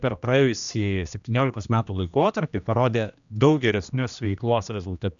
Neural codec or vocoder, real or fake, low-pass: codec, 16 kHz, 1 kbps, X-Codec, HuBERT features, trained on general audio; fake; 7.2 kHz